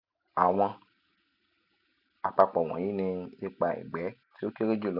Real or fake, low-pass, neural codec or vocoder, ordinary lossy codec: real; 5.4 kHz; none; none